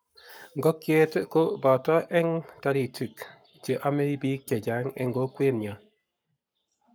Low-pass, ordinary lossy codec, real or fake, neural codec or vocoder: none; none; fake; codec, 44.1 kHz, 7.8 kbps, Pupu-Codec